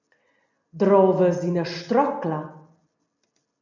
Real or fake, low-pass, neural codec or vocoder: real; 7.2 kHz; none